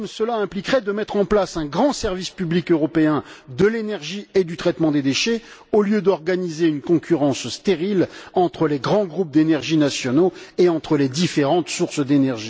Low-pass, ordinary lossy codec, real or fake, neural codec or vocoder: none; none; real; none